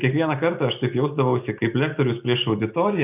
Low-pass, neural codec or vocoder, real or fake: 3.6 kHz; none; real